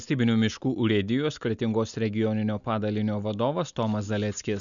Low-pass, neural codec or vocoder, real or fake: 7.2 kHz; none; real